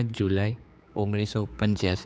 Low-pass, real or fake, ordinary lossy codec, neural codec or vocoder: none; fake; none; codec, 16 kHz, 4 kbps, X-Codec, HuBERT features, trained on general audio